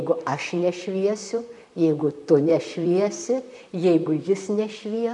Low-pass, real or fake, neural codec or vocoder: 10.8 kHz; fake; vocoder, 44.1 kHz, 128 mel bands, Pupu-Vocoder